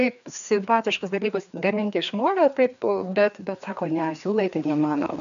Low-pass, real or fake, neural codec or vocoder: 7.2 kHz; fake; codec, 16 kHz, 2 kbps, FreqCodec, larger model